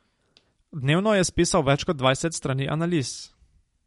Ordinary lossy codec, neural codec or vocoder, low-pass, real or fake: MP3, 48 kbps; none; 19.8 kHz; real